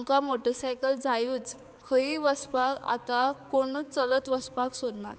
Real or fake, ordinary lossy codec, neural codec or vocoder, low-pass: fake; none; codec, 16 kHz, 4 kbps, X-Codec, HuBERT features, trained on balanced general audio; none